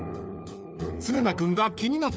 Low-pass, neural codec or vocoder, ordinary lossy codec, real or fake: none; codec, 16 kHz, 4 kbps, FunCodec, trained on LibriTTS, 50 frames a second; none; fake